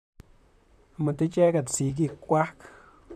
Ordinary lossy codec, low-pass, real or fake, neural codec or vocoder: none; 14.4 kHz; fake; vocoder, 44.1 kHz, 128 mel bands, Pupu-Vocoder